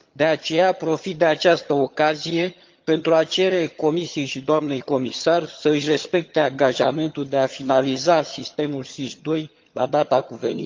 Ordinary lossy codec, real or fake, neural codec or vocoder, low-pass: Opus, 32 kbps; fake; vocoder, 22.05 kHz, 80 mel bands, HiFi-GAN; 7.2 kHz